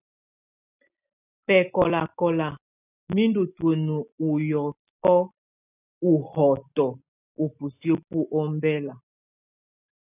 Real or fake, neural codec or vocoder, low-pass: real; none; 3.6 kHz